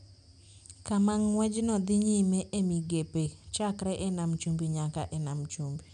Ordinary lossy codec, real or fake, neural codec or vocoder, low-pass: none; real; none; 9.9 kHz